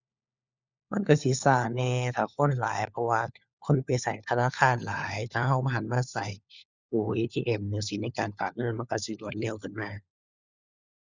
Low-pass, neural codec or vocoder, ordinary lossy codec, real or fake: 7.2 kHz; codec, 16 kHz, 4 kbps, FunCodec, trained on LibriTTS, 50 frames a second; Opus, 64 kbps; fake